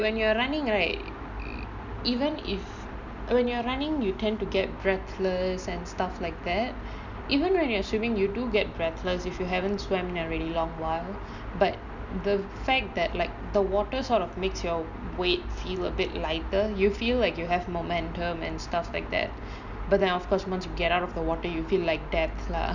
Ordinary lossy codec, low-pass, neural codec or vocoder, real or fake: none; 7.2 kHz; none; real